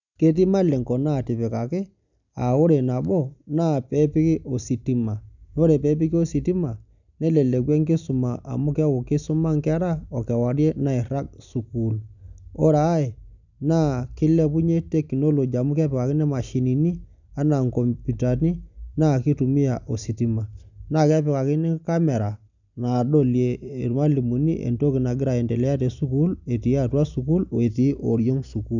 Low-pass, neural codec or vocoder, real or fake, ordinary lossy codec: 7.2 kHz; none; real; none